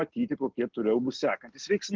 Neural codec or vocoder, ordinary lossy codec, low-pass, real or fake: none; Opus, 16 kbps; 7.2 kHz; real